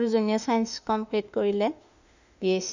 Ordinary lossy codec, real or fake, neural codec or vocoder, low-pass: none; fake; autoencoder, 48 kHz, 32 numbers a frame, DAC-VAE, trained on Japanese speech; 7.2 kHz